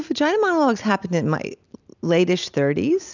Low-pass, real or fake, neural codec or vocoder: 7.2 kHz; real; none